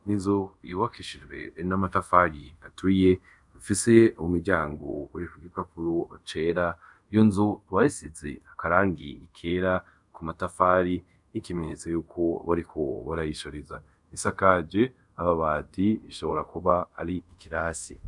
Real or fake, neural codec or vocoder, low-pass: fake; codec, 24 kHz, 0.5 kbps, DualCodec; 10.8 kHz